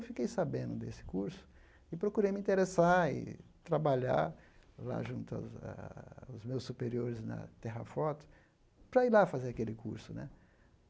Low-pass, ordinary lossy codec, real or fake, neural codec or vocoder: none; none; real; none